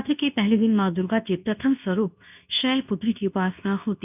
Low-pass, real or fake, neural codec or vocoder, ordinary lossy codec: 3.6 kHz; fake; codec, 24 kHz, 0.9 kbps, WavTokenizer, medium speech release version 2; none